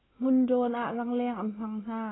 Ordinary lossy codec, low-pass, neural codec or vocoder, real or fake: AAC, 16 kbps; 7.2 kHz; none; real